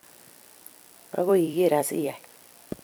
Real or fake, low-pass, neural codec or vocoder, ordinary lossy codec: fake; none; vocoder, 44.1 kHz, 128 mel bands every 256 samples, BigVGAN v2; none